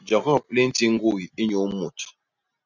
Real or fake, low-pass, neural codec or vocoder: real; 7.2 kHz; none